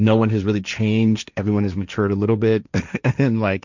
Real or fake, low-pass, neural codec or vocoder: fake; 7.2 kHz; codec, 16 kHz, 1.1 kbps, Voila-Tokenizer